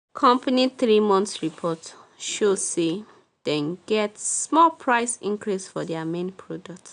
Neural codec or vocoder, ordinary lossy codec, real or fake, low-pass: none; none; real; 9.9 kHz